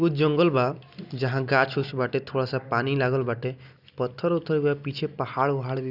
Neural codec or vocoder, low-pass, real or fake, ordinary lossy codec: none; 5.4 kHz; real; none